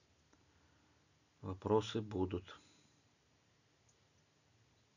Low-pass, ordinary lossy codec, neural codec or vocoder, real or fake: 7.2 kHz; none; none; real